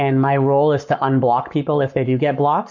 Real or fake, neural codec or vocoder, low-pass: fake; codec, 44.1 kHz, 7.8 kbps, Pupu-Codec; 7.2 kHz